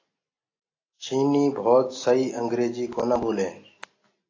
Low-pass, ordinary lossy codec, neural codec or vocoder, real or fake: 7.2 kHz; AAC, 32 kbps; none; real